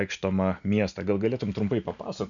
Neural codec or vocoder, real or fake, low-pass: none; real; 7.2 kHz